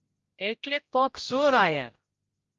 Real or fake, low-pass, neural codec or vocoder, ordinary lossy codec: fake; 7.2 kHz; codec, 16 kHz, 0.5 kbps, X-Codec, HuBERT features, trained on general audio; Opus, 32 kbps